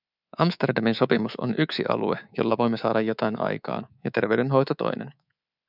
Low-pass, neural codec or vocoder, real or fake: 5.4 kHz; codec, 24 kHz, 3.1 kbps, DualCodec; fake